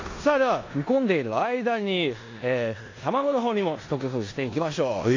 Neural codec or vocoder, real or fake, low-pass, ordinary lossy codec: codec, 16 kHz in and 24 kHz out, 0.9 kbps, LongCat-Audio-Codec, four codebook decoder; fake; 7.2 kHz; AAC, 32 kbps